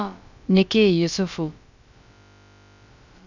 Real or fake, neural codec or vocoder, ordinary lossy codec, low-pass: fake; codec, 16 kHz, about 1 kbps, DyCAST, with the encoder's durations; none; 7.2 kHz